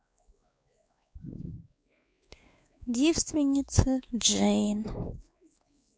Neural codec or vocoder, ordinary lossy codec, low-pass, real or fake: codec, 16 kHz, 2 kbps, X-Codec, WavLM features, trained on Multilingual LibriSpeech; none; none; fake